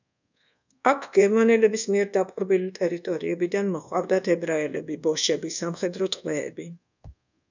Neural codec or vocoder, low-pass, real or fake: codec, 24 kHz, 1.2 kbps, DualCodec; 7.2 kHz; fake